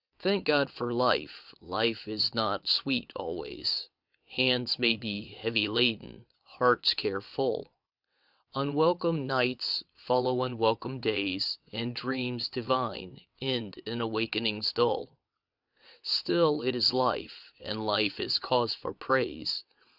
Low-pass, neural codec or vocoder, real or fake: 5.4 kHz; vocoder, 22.05 kHz, 80 mel bands, WaveNeXt; fake